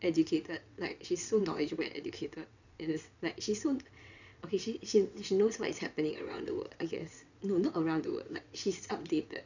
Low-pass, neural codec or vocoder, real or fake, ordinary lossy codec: 7.2 kHz; vocoder, 22.05 kHz, 80 mel bands, WaveNeXt; fake; none